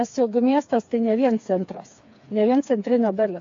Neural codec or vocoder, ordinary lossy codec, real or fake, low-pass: codec, 16 kHz, 4 kbps, FreqCodec, smaller model; AAC, 48 kbps; fake; 7.2 kHz